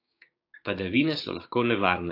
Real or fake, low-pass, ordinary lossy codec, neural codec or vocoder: fake; 5.4 kHz; AAC, 32 kbps; codec, 16 kHz, 6 kbps, DAC